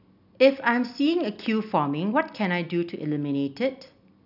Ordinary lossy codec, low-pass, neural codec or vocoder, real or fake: none; 5.4 kHz; none; real